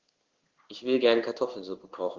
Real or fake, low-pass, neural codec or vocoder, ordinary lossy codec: fake; 7.2 kHz; codec, 16 kHz in and 24 kHz out, 1 kbps, XY-Tokenizer; Opus, 16 kbps